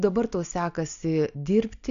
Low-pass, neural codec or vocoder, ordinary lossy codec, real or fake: 7.2 kHz; none; AAC, 96 kbps; real